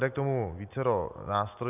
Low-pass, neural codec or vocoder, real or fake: 3.6 kHz; none; real